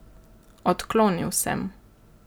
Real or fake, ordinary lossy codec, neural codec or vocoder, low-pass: real; none; none; none